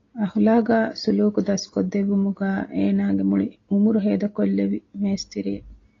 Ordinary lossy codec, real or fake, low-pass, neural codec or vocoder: AAC, 32 kbps; real; 7.2 kHz; none